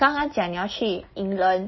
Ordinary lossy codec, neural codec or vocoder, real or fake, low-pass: MP3, 24 kbps; none; real; 7.2 kHz